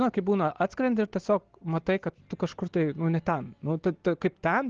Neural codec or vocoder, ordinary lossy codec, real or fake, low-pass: none; Opus, 16 kbps; real; 7.2 kHz